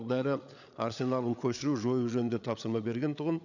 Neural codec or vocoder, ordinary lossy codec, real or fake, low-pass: codec, 16 kHz, 8 kbps, FreqCodec, larger model; none; fake; 7.2 kHz